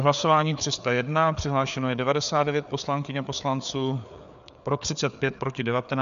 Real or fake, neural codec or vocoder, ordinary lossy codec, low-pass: fake; codec, 16 kHz, 4 kbps, FreqCodec, larger model; MP3, 96 kbps; 7.2 kHz